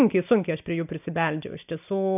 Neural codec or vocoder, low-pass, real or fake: none; 3.6 kHz; real